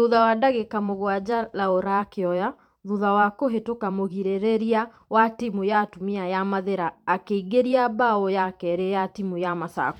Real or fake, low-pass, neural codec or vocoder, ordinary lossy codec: fake; 19.8 kHz; vocoder, 44.1 kHz, 128 mel bands every 512 samples, BigVGAN v2; none